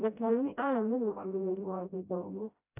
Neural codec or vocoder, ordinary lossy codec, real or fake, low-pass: codec, 16 kHz, 0.5 kbps, FreqCodec, smaller model; none; fake; 3.6 kHz